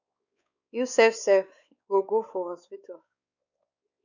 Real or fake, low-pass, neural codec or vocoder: fake; 7.2 kHz; codec, 16 kHz, 4 kbps, X-Codec, WavLM features, trained on Multilingual LibriSpeech